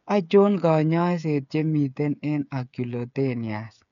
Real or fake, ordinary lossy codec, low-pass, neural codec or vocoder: fake; none; 7.2 kHz; codec, 16 kHz, 8 kbps, FreqCodec, smaller model